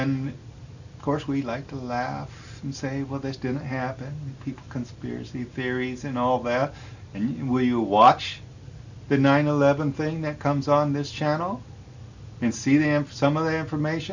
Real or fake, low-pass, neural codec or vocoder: real; 7.2 kHz; none